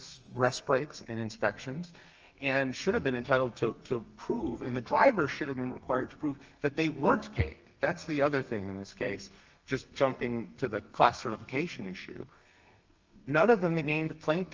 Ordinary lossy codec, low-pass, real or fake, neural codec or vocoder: Opus, 24 kbps; 7.2 kHz; fake; codec, 32 kHz, 1.9 kbps, SNAC